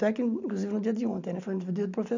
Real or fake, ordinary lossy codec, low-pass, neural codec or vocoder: real; none; 7.2 kHz; none